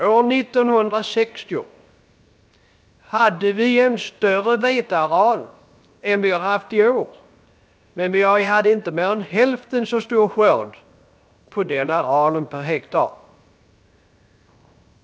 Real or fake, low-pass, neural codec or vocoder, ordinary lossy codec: fake; none; codec, 16 kHz, 0.7 kbps, FocalCodec; none